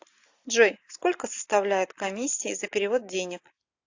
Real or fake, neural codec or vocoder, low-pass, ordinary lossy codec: real; none; 7.2 kHz; AAC, 48 kbps